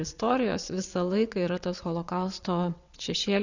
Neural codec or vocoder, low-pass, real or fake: vocoder, 22.05 kHz, 80 mel bands, WaveNeXt; 7.2 kHz; fake